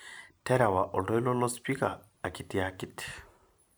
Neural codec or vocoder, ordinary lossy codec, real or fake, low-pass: vocoder, 44.1 kHz, 128 mel bands every 512 samples, BigVGAN v2; none; fake; none